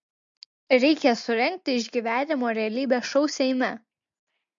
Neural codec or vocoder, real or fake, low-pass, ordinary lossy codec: none; real; 7.2 kHz; MP3, 48 kbps